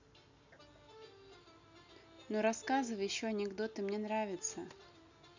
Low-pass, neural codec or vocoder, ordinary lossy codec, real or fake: 7.2 kHz; none; none; real